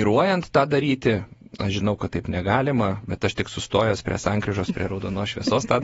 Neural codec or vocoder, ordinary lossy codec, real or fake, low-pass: vocoder, 48 kHz, 128 mel bands, Vocos; AAC, 24 kbps; fake; 19.8 kHz